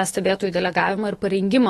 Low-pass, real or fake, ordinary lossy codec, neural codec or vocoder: 19.8 kHz; fake; AAC, 32 kbps; autoencoder, 48 kHz, 128 numbers a frame, DAC-VAE, trained on Japanese speech